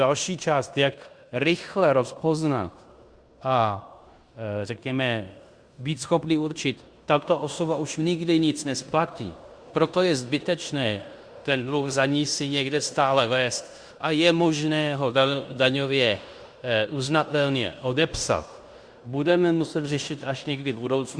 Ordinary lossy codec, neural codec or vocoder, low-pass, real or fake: Opus, 64 kbps; codec, 16 kHz in and 24 kHz out, 0.9 kbps, LongCat-Audio-Codec, fine tuned four codebook decoder; 9.9 kHz; fake